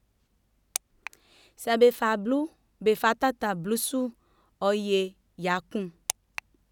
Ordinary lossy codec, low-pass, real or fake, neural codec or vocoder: none; 19.8 kHz; real; none